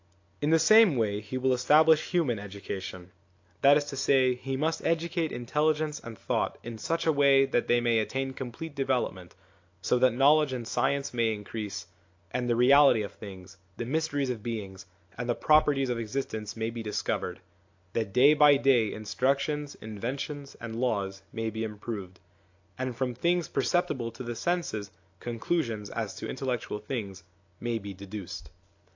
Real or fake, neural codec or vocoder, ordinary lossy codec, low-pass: real; none; AAC, 48 kbps; 7.2 kHz